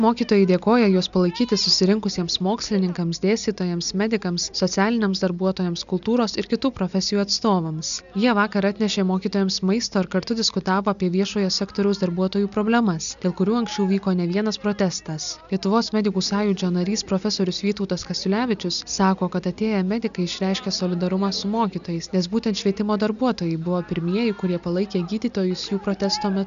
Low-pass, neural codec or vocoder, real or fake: 7.2 kHz; none; real